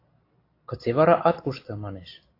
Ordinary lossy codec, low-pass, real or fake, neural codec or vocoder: AAC, 32 kbps; 5.4 kHz; real; none